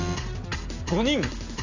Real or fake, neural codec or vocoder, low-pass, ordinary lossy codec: real; none; 7.2 kHz; AAC, 48 kbps